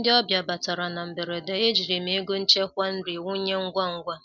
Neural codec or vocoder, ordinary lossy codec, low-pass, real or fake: none; none; 7.2 kHz; real